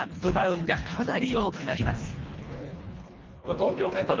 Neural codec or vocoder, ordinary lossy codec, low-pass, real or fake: codec, 24 kHz, 1.5 kbps, HILCodec; Opus, 32 kbps; 7.2 kHz; fake